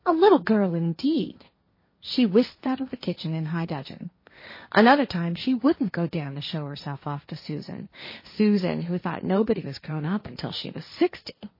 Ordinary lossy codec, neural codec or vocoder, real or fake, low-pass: MP3, 24 kbps; codec, 16 kHz, 1.1 kbps, Voila-Tokenizer; fake; 5.4 kHz